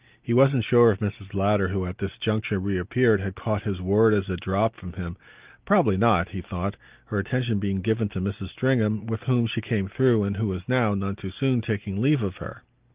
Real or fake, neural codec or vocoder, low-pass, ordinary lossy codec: real; none; 3.6 kHz; Opus, 32 kbps